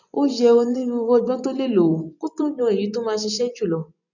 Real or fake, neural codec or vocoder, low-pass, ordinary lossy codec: fake; vocoder, 24 kHz, 100 mel bands, Vocos; 7.2 kHz; none